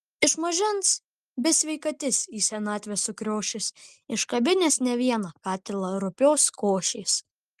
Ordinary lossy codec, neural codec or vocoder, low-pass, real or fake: Opus, 32 kbps; none; 14.4 kHz; real